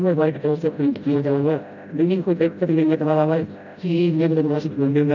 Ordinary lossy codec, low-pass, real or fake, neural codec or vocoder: none; 7.2 kHz; fake; codec, 16 kHz, 0.5 kbps, FreqCodec, smaller model